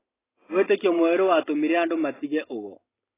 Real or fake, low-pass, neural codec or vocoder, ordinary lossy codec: real; 3.6 kHz; none; AAC, 16 kbps